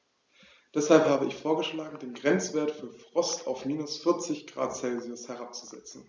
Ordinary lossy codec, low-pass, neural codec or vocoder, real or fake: none; none; none; real